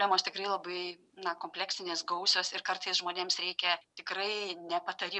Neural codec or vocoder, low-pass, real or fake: none; 10.8 kHz; real